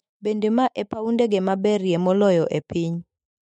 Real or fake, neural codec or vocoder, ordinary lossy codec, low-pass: fake; autoencoder, 48 kHz, 128 numbers a frame, DAC-VAE, trained on Japanese speech; MP3, 48 kbps; 19.8 kHz